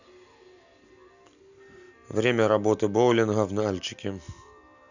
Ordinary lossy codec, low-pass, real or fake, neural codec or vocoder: none; 7.2 kHz; real; none